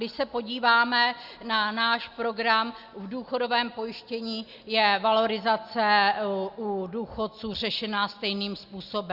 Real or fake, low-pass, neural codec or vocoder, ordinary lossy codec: real; 5.4 kHz; none; Opus, 64 kbps